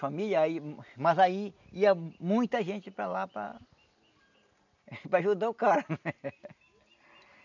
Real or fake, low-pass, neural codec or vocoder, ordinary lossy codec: real; 7.2 kHz; none; none